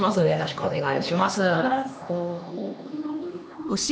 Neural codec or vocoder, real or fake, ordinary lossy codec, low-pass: codec, 16 kHz, 2 kbps, X-Codec, HuBERT features, trained on LibriSpeech; fake; none; none